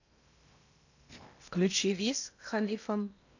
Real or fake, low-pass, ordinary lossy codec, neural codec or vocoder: fake; 7.2 kHz; none; codec, 16 kHz in and 24 kHz out, 0.8 kbps, FocalCodec, streaming, 65536 codes